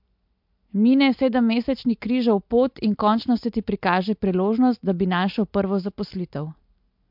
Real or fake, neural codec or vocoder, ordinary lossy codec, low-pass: real; none; MP3, 48 kbps; 5.4 kHz